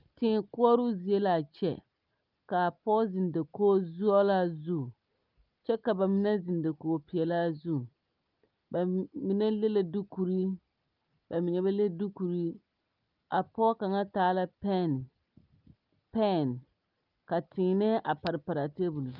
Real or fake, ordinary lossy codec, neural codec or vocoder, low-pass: real; Opus, 24 kbps; none; 5.4 kHz